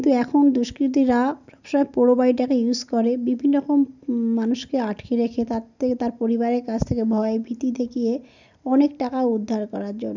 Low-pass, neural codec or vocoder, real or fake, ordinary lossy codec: 7.2 kHz; none; real; none